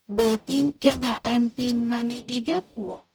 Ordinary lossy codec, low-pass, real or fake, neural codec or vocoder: none; none; fake; codec, 44.1 kHz, 0.9 kbps, DAC